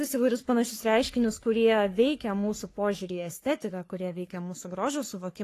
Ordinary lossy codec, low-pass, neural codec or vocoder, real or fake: AAC, 48 kbps; 14.4 kHz; codec, 44.1 kHz, 3.4 kbps, Pupu-Codec; fake